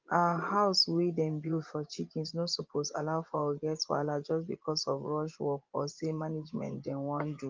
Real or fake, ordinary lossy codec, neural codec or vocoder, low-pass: fake; Opus, 24 kbps; vocoder, 24 kHz, 100 mel bands, Vocos; 7.2 kHz